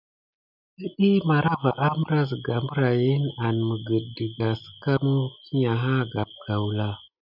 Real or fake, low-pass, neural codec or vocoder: real; 5.4 kHz; none